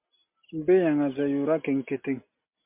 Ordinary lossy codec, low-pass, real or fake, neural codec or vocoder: MP3, 32 kbps; 3.6 kHz; real; none